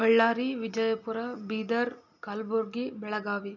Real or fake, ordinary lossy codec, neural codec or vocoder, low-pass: real; none; none; 7.2 kHz